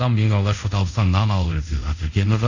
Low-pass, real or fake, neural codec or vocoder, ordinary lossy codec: 7.2 kHz; fake; codec, 24 kHz, 0.5 kbps, DualCodec; none